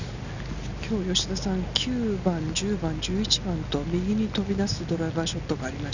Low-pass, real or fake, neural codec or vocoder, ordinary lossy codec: 7.2 kHz; real; none; none